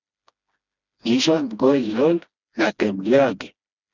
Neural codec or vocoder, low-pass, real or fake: codec, 16 kHz, 1 kbps, FreqCodec, smaller model; 7.2 kHz; fake